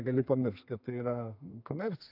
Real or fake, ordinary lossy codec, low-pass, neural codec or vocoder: fake; AAC, 32 kbps; 5.4 kHz; codec, 32 kHz, 1.9 kbps, SNAC